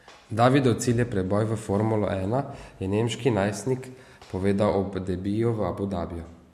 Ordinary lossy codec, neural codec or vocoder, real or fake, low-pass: MP3, 64 kbps; none; real; 14.4 kHz